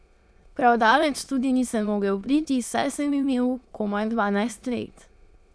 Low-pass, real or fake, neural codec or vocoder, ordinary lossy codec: none; fake; autoencoder, 22.05 kHz, a latent of 192 numbers a frame, VITS, trained on many speakers; none